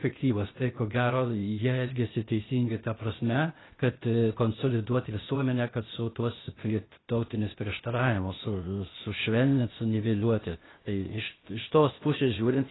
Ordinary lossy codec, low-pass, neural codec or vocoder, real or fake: AAC, 16 kbps; 7.2 kHz; codec, 16 kHz, 0.8 kbps, ZipCodec; fake